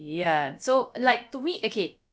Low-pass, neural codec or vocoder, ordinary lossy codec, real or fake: none; codec, 16 kHz, about 1 kbps, DyCAST, with the encoder's durations; none; fake